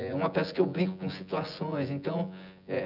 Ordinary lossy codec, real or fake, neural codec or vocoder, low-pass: none; fake; vocoder, 24 kHz, 100 mel bands, Vocos; 5.4 kHz